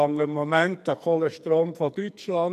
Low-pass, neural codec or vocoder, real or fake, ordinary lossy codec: 14.4 kHz; codec, 44.1 kHz, 2.6 kbps, SNAC; fake; MP3, 96 kbps